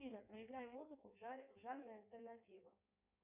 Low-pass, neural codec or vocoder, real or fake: 3.6 kHz; codec, 16 kHz in and 24 kHz out, 1.1 kbps, FireRedTTS-2 codec; fake